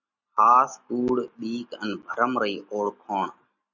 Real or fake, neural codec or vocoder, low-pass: real; none; 7.2 kHz